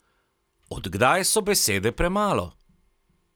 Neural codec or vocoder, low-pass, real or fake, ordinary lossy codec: none; none; real; none